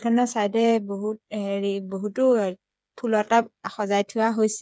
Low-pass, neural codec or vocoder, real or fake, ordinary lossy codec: none; codec, 16 kHz, 8 kbps, FreqCodec, smaller model; fake; none